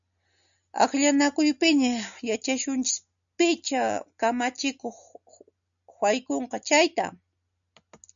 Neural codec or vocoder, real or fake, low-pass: none; real; 7.2 kHz